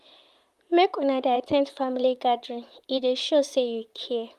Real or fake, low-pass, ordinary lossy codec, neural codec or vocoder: fake; 14.4 kHz; Opus, 32 kbps; vocoder, 44.1 kHz, 128 mel bands, Pupu-Vocoder